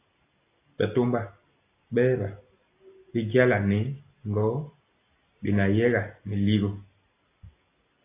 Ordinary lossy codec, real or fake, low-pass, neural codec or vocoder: AAC, 24 kbps; real; 3.6 kHz; none